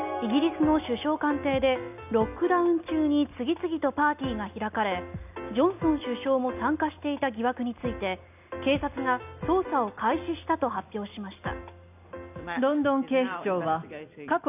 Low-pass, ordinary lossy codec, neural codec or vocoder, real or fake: 3.6 kHz; none; none; real